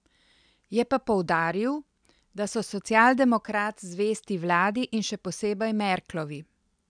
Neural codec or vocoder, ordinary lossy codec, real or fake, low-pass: none; none; real; 9.9 kHz